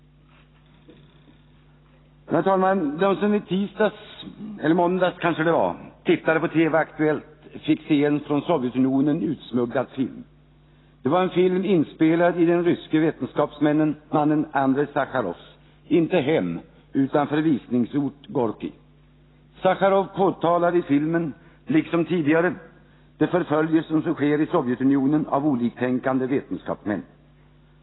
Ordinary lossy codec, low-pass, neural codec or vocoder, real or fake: AAC, 16 kbps; 7.2 kHz; none; real